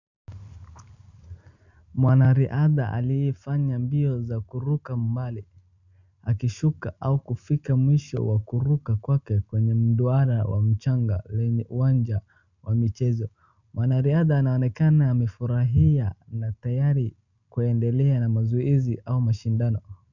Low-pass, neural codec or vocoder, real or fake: 7.2 kHz; none; real